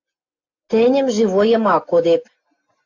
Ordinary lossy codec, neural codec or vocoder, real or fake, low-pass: AAC, 48 kbps; none; real; 7.2 kHz